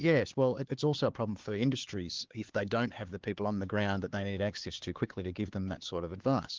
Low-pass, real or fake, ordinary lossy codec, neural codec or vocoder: 7.2 kHz; fake; Opus, 16 kbps; codec, 16 kHz, 4 kbps, X-Codec, HuBERT features, trained on LibriSpeech